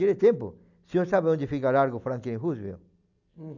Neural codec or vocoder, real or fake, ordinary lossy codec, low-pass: none; real; none; 7.2 kHz